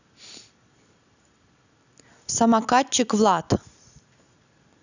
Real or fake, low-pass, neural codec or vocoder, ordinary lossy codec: real; 7.2 kHz; none; none